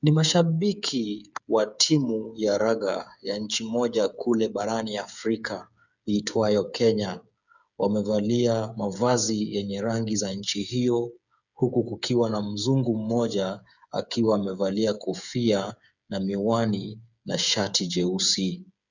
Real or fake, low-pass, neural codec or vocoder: fake; 7.2 kHz; codec, 16 kHz, 8 kbps, FreqCodec, smaller model